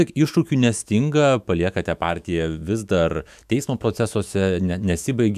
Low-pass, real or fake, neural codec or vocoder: 14.4 kHz; fake; autoencoder, 48 kHz, 128 numbers a frame, DAC-VAE, trained on Japanese speech